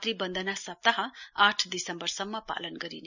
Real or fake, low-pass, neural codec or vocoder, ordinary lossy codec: real; 7.2 kHz; none; none